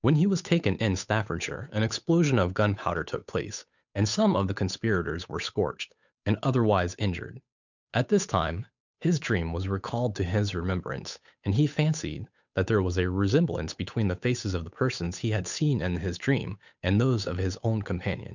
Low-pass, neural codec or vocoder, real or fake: 7.2 kHz; codec, 16 kHz, 8 kbps, FunCodec, trained on Chinese and English, 25 frames a second; fake